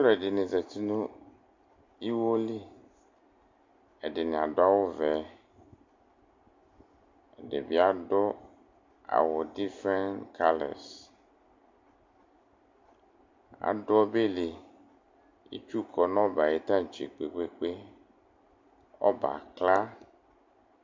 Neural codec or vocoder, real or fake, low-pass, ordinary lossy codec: none; real; 7.2 kHz; MP3, 64 kbps